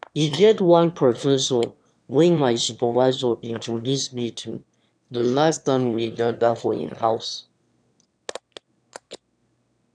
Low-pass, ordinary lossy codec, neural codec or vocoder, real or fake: 9.9 kHz; none; autoencoder, 22.05 kHz, a latent of 192 numbers a frame, VITS, trained on one speaker; fake